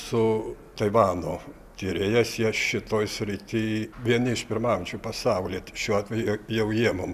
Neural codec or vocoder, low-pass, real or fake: none; 14.4 kHz; real